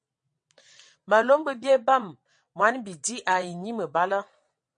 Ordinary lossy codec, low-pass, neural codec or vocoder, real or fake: MP3, 48 kbps; 9.9 kHz; vocoder, 22.05 kHz, 80 mel bands, WaveNeXt; fake